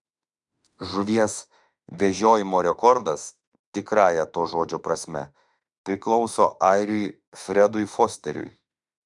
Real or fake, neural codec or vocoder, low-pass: fake; autoencoder, 48 kHz, 32 numbers a frame, DAC-VAE, trained on Japanese speech; 10.8 kHz